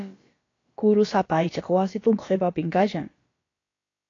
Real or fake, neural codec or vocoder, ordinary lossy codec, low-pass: fake; codec, 16 kHz, about 1 kbps, DyCAST, with the encoder's durations; AAC, 32 kbps; 7.2 kHz